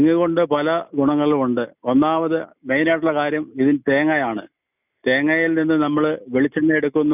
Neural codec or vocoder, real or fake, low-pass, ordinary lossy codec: none; real; 3.6 kHz; none